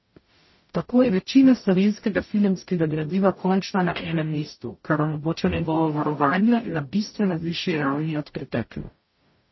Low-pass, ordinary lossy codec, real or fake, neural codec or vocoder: 7.2 kHz; MP3, 24 kbps; fake; codec, 44.1 kHz, 0.9 kbps, DAC